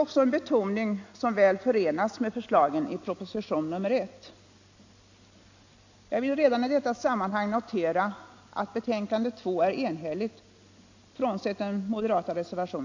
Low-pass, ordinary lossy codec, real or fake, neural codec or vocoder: 7.2 kHz; AAC, 48 kbps; real; none